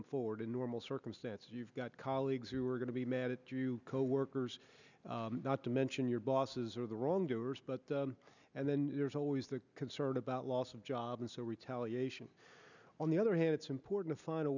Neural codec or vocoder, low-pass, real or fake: none; 7.2 kHz; real